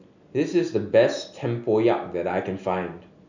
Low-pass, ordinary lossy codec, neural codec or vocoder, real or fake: 7.2 kHz; none; none; real